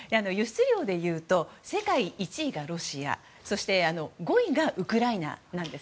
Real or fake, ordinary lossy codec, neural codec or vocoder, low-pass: real; none; none; none